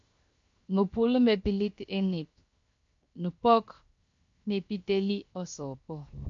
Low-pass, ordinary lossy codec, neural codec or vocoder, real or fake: 7.2 kHz; MP3, 48 kbps; codec, 16 kHz, 0.7 kbps, FocalCodec; fake